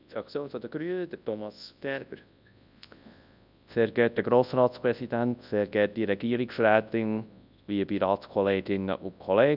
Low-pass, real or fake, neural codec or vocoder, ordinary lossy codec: 5.4 kHz; fake; codec, 24 kHz, 0.9 kbps, WavTokenizer, large speech release; none